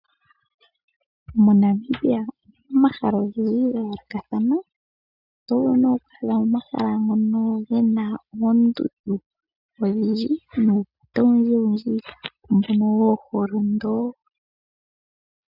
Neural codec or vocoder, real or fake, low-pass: none; real; 5.4 kHz